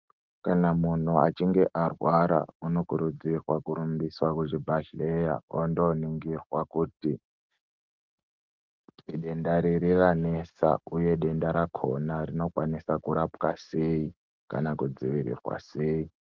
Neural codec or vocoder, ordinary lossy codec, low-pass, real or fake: none; Opus, 32 kbps; 7.2 kHz; real